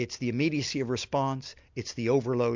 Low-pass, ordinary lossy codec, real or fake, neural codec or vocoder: 7.2 kHz; MP3, 64 kbps; real; none